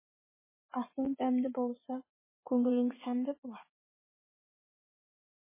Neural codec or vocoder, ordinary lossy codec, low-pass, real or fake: vocoder, 44.1 kHz, 80 mel bands, Vocos; MP3, 16 kbps; 3.6 kHz; fake